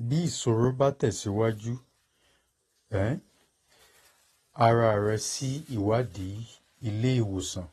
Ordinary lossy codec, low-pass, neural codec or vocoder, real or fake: AAC, 32 kbps; 19.8 kHz; none; real